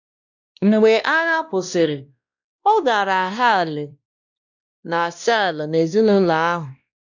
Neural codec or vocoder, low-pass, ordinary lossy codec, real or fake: codec, 16 kHz, 1 kbps, X-Codec, WavLM features, trained on Multilingual LibriSpeech; 7.2 kHz; none; fake